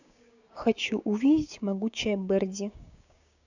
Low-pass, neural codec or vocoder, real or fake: 7.2 kHz; codec, 16 kHz, 6 kbps, DAC; fake